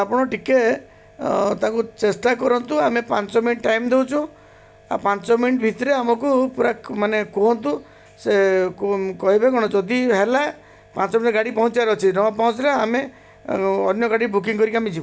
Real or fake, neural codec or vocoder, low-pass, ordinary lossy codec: real; none; none; none